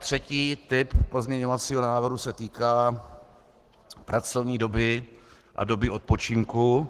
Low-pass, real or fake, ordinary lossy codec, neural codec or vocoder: 14.4 kHz; fake; Opus, 16 kbps; codec, 44.1 kHz, 7.8 kbps, Pupu-Codec